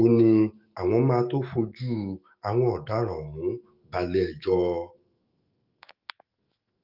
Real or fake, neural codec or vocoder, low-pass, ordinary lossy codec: fake; autoencoder, 48 kHz, 128 numbers a frame, DAC-VAE, trained on Japanese speech; 5.4 kHz; Opus, 24 kbps